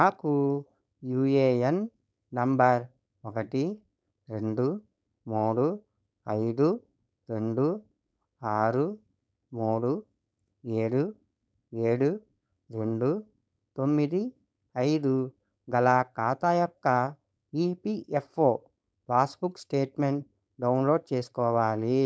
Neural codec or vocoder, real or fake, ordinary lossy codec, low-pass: codec, 16 kHz, 4.8 kbps, FACodec; fake; none; none